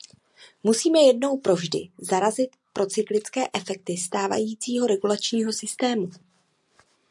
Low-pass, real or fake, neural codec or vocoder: 10.8 kHz; real; none